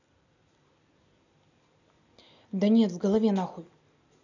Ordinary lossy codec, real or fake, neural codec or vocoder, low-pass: none; real; none; 7.2 kHz